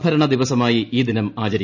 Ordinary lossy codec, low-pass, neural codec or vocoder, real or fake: none; 7.2 kHz; none; real